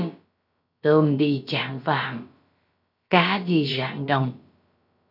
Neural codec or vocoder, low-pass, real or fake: codec, 16 kHz, about 1 kbps, DyCAST, with the encoder's durations; 5.4 kHz; fake